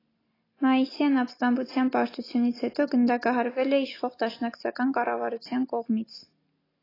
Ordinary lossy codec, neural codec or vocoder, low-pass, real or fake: AAC, 24 kbps; none; 5.4 kHz; real